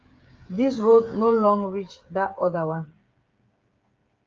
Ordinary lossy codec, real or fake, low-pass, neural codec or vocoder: Opus, 24 kbps; fake; 7.2 kHz; codec, 16 kHz, 8 kbps, FreqCodec, smaller model